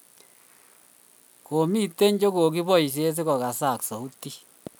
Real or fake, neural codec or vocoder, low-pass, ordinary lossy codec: real; none; none; none